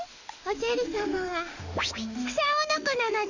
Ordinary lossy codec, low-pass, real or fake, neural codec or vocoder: none; 7.2 kHz; fake; autoencoder, 48 kHz, 32 numbers a frame, DAC-VAE, trained on Japanese speech